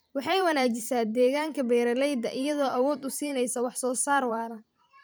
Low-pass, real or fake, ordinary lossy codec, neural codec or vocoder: none; fake; none; vocoder, 44.1 kHz, 128 mel bands every 512 samples, BigVGAN v2